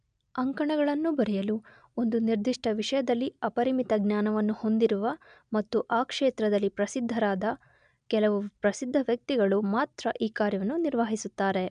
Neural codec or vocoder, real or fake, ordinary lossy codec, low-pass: none; real; MP3, 96 kbps; 9.9 kHz